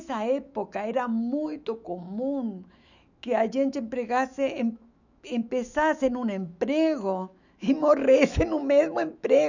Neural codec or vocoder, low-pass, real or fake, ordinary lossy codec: autoencoder, 48 kHz, 128 numbers a frame, DAC-VAE, trained on Japanese speech; 7.2 kHz; fake; none